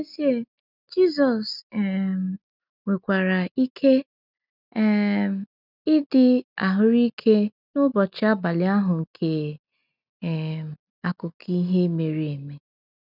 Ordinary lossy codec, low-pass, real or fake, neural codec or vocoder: none; 5.4 kHz; real; none